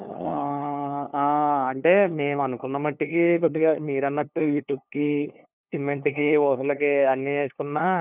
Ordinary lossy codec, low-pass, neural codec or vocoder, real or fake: none; 3.6 kHz; codec, 16 kHz, 2 kbps, FunCodec, trained on LibriTTS, 25 frames a second; fake